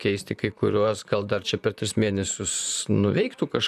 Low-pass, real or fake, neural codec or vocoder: 14.4 kHz; fake; vocoder, 44.1 kHz, 128 mel bands, Pupu-Vocoder